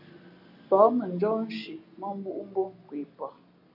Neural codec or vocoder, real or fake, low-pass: none; real; 5.4 kHz